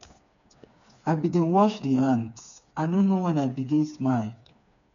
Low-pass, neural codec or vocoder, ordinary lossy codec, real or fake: 7.2 kHz; codec, 16 kHz, 4 kbps, FreqCodec, smaller model; none; fake